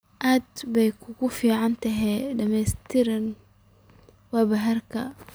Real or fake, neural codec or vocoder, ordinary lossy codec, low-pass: real; none; none; none